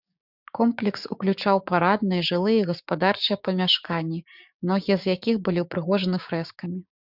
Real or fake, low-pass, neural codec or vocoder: fake; 5.4 kHz; codec, 16 kHz, 6 kbps, DAC